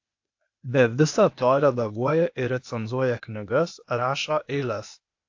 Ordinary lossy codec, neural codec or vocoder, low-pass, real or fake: AAC, 48 kbps; codec, 16 kHz, 0.8 kbps, ZipCodec; 7.2 kHz; fake